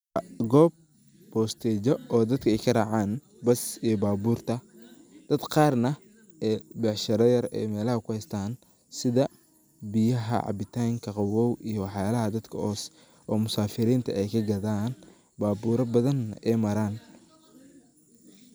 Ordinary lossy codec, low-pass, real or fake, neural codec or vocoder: none; none; real; none